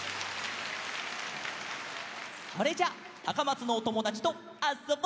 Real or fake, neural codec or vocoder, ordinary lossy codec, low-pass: real; none; none; none